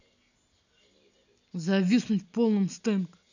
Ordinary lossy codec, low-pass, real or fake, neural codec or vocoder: none; 7.2 kHz; real; none